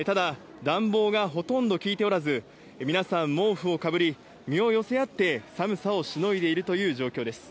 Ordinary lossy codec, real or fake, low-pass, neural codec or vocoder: none; real; none; none